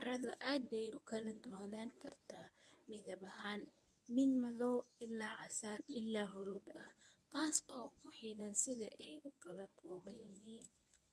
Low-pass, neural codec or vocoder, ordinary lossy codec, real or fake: none; codec, 24 kHz, 0.9 kbps, WavTokenizer, medium speech release version 1; none; fake